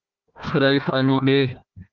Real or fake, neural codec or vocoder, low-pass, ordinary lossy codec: fake; codec, 16 kHz, 1 kbps, FunCodec, trained on Chinese and English, 50 frames a second; 7.2 kHz; Opus, 32 kbps